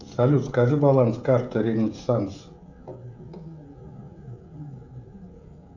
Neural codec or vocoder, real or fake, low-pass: codec, 16 kHz, 16 kbps, FreqCodec, smaller model; fake; 7.2 kHz